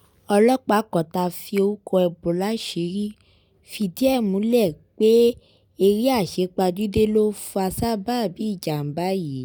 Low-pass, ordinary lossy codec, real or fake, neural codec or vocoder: none; none; real; none